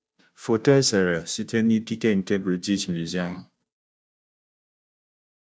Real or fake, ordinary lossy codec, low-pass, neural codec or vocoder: fake; none; none; codec, 16 kHz, 0.5 kbps, FunCodec, trained on Chinese and English, 25 frames a second